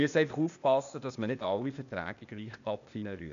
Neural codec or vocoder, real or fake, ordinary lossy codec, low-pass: codec, 16 kHz, 0.8 kbps, ZipCodec; fake; none; 7.2 kHz